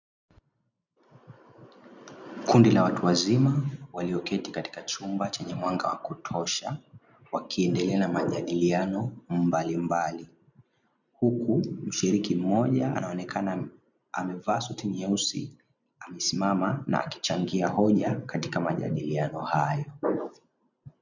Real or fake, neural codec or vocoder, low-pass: real; none; 7.2 kHz